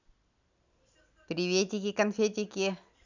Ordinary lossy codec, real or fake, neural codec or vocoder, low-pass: none; real; none; 7.2 kHz